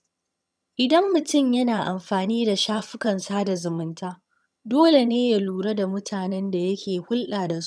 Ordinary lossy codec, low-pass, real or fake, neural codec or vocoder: none; none; fake; vocoder, 22.05 kHz, 80 mel bands, HiFi-GAN